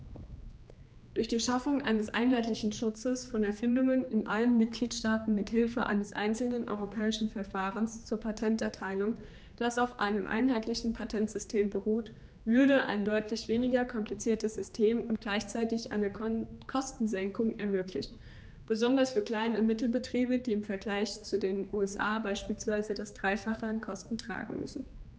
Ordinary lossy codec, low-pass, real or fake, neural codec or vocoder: none; none; fake; codec, 16 kHz, 2 kbps, X-Codec, HuBERT features, trained on general audio